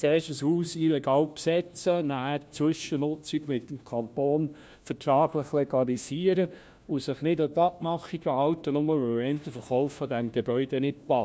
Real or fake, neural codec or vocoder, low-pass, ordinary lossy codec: fake; codec, 16 kHz, 1 kbps, FunCodec, trained on LibriTTS, 50 frames a second; none; none